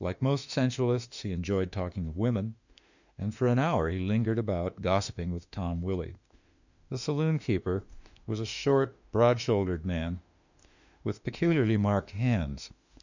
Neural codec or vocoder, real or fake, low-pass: autoencoder, 48 kHz, 32 numbers a frame, DAC-VAE, trained on Japanese speech; fake; 7.2 kHz